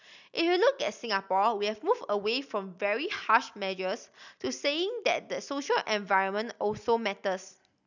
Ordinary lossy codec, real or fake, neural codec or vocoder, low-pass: none; real; none; 7.2 kHz